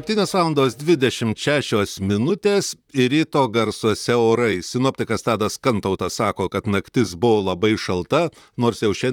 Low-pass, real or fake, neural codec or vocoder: 19.8 kHz; fake; vocoder, 44.1 kHz, 128 mel bands, Pupu-Vocoder